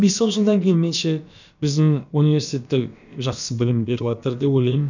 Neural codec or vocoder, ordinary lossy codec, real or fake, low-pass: codec, 16 kHz, about 1 kbps, DyCAST, with the encoder's durations; none; fake; 7.2 kHz